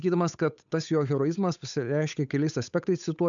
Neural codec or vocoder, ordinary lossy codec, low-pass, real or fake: codec, 16 kHz, 8 kbps, FunCodec, trained on Chinese and English, 25 frames a second; MP3, 96 kbps; 7.2 kHz; fake